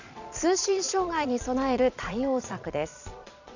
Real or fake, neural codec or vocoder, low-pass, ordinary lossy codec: fake; vocoder, 22.05 kHz, 80 mel bands, Vocos; 7.2 kHz; none